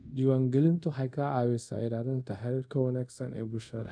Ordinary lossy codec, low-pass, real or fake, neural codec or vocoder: none; 9.9 kHz; fake; codec, 24 kHz, 0.5 kbps, DualCodec